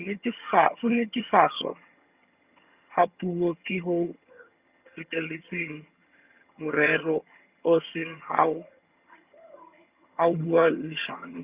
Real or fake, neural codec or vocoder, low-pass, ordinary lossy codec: fake; vocoder, 22.05 kHz, 80 mel bands, HiFi-GAN; 3.6 kHz; Opus, 64 kbps